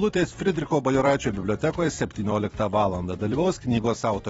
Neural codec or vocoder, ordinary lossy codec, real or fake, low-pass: vocoder, 44.1 kHz, 128 mel bands every 256 samples, BigVGAN v2; AAC, 24 kbps; fake; 19.8 kHz